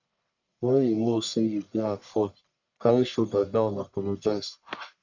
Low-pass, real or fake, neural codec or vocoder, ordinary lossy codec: 7.2 kHz; fake; codec, 44.1 kHz, 1.7 kbps, Pupu-Codec; none